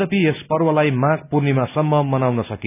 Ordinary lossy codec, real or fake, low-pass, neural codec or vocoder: none; real; 3.6 kHz; none